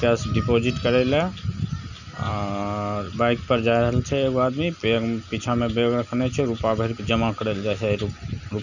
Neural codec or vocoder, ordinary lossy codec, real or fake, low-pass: none; none; real; 7.2 kHz